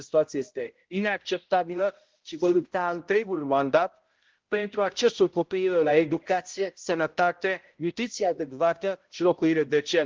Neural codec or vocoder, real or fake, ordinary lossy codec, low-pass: codec, 16 kHz, 0.5 kbps, X-Codec, HuBERT features, trained on balanced general audio; fake; Opus, 16 kbps; 7.2 kHz